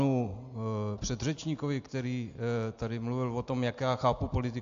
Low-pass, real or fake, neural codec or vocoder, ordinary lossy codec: 7.2 kHz; real; none; AAC, 64 kbps